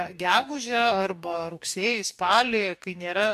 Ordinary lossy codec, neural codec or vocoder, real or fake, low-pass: MP3, 96 kbps; codec, 44.1 kHz, 2.6 kbps, DAC; fake; 14.4 kHz